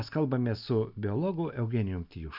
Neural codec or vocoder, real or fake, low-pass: none; real; 5.4 kHz